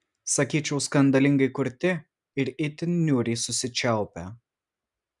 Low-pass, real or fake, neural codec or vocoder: 10.8 kHz; real; none